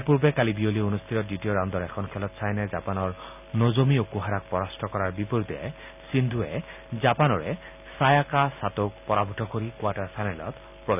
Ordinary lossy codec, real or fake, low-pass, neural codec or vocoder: none; real; 3.6 kHz; none